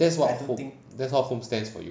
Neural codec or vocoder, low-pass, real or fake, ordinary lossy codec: none; none; real; none